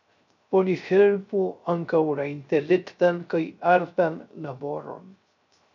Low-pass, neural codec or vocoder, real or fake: 7.2 kHz; codec, 16 kHz, 0.3 kbps, FocalCodec; fake